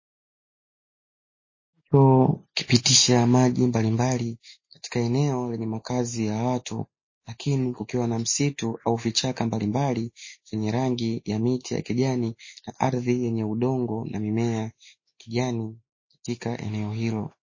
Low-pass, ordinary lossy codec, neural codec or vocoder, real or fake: 7.2 kHz; MP3, 32 kbps; none; real